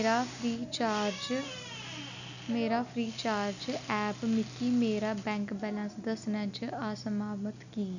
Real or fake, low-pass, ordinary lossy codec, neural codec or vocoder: real; 7.2 kHz; none; none